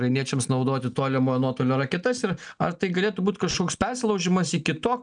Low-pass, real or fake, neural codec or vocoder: 9.9 kHz; real; none